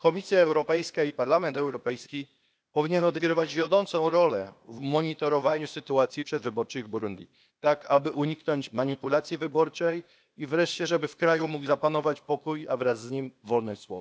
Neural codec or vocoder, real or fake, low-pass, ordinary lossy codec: codec, 16 kHz, 0.8 kbps, ZipCodec; fake; none; none